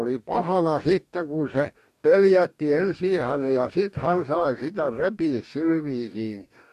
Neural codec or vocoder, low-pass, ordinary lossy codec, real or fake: codec, 44.1 kHz, 2.6 kbps, DAC; 14.4 kHz; MP3, 64 kbps; fake